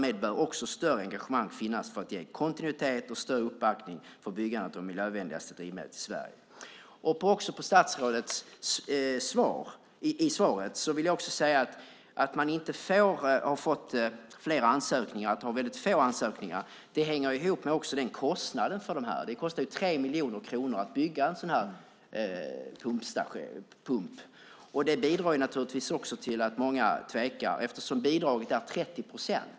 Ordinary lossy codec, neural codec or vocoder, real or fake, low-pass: none; none; real; none